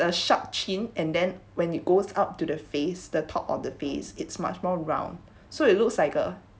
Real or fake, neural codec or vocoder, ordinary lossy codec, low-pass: real; none; none; none